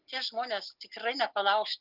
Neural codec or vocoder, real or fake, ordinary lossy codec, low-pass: none; real; Opus, 24 kbps; 5.4 kHz